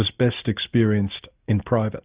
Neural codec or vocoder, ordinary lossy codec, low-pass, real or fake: none; Opus, 64 kbps; 3.6 kHz; real